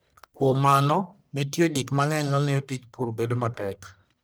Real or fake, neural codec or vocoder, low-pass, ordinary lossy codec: fake; codec, 44.1 kHz, 1.7 kbps, Pupu-Codec; none; none